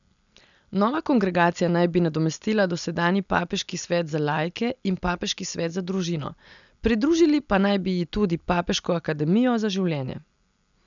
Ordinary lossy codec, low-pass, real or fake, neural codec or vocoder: none; 7.2 kHz; real; none